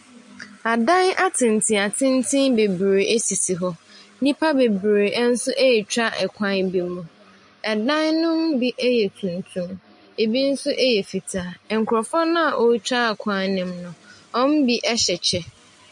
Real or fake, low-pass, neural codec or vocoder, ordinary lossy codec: real; 10.8 kHz; none; MP3, 48 kbps